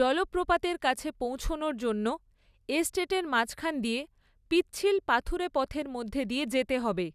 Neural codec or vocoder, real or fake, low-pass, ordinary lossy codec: none; real; 14.4 kHz; none